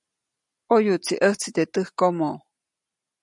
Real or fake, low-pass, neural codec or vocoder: real; 10.8 kHz; none